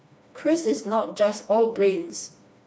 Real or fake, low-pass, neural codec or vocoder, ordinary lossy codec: fake; none; codec, 16 kHz, 2 kbps, FreqCodec, smaller model; none